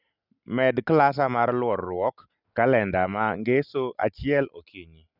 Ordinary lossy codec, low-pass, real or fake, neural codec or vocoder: none; 5.4 kHz; real; none